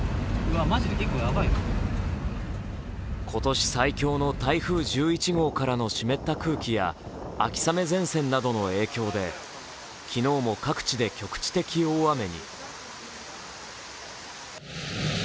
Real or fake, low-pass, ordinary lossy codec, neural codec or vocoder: real; none; none; none